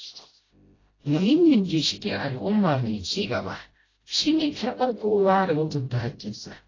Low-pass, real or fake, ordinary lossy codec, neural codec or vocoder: 7.2 kHz; fake; AAC, 32 kbps; codec, 16 kHz, 0.5 kbps, FreqCodec, smaller model